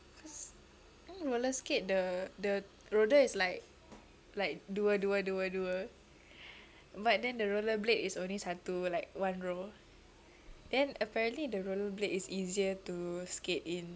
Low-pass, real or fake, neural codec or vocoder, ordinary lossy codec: none; real; none; none